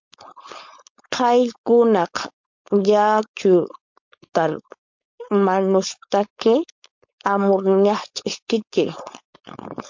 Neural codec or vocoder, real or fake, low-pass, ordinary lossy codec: codec, 16 kHz, 4.8 kbps, FACodec; fake; 7.2 kHz; MP3, 48 kbps